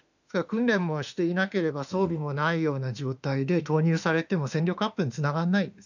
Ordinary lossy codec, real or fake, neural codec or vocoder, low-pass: none; fake; autoencoder, 48 kHz, 32 numbers a frame, DAC-VAE, trained on Japanese speech; 7.2 kHz